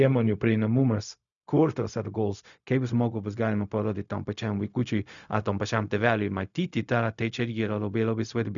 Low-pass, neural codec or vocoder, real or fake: 7.2 kHz; codec, 16 kHz, 0.4 kbps, LongCat-Audio-Codec; fake